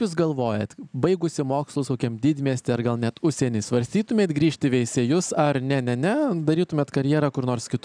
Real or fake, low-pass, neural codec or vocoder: real; 9.9 kHz; none